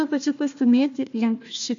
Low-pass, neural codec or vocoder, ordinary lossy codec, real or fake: 7.2 kHz; codec, 16 kHz, 1 kbps, FunCodec, trained on Chinese and English, 50 frames a second; MP3, 64 kbps; fake